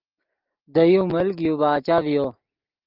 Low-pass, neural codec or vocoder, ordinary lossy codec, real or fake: 5.4 kHz; none; Opus, 32 kbps; real